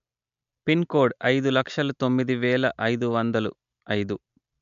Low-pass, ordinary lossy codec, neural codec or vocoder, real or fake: 7.2 kHz; MP3, 64 kbps; none; real